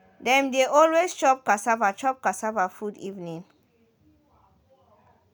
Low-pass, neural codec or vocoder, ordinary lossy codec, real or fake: none; none; none; real